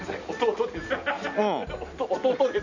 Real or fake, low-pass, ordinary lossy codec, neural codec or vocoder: real; 7.2 kHz; none; none